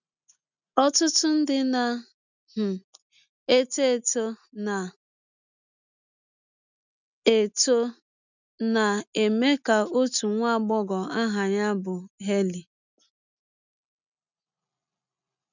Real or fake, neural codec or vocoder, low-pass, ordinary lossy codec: real; none; 7.2 kHz; none